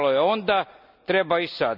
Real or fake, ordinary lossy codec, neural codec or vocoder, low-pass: real; none; none; 5.4 kHz